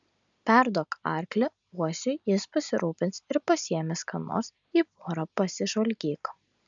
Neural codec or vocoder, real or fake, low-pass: none; real; 7.2 kHz